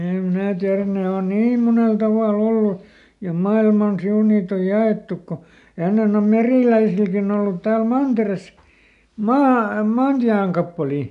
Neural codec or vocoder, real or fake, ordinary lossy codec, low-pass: none; real; none; 10.8 kHz